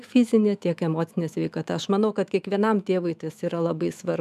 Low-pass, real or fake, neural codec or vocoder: 14.4 kHz; fake; autoencoder, 48 kHz, 128 numbers a frame, DAC-VAE, trained on Japanese speech